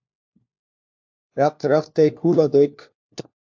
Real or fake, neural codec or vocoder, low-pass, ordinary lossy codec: fake; codec, 16 kHz, 1 kbps, FunCodec, trained on LibriTTS, 50 frames a second; 7.2 kHz; AAC, 48 kbps